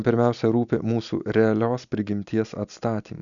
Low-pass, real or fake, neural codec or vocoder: 7.2 kHz; real; none